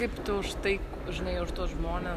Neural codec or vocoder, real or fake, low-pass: none; real; 14.4 kHz